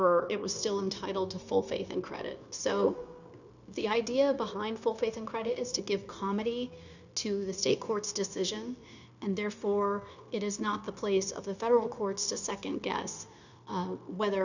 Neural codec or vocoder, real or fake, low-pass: codec, 16 kHz, 0.9 kbps, LongCat-Audio-Codec; fake; 7.2 kHz